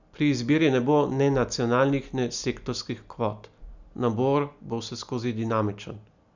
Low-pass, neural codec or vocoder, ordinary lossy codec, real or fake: 7.2 kHz; none; none; real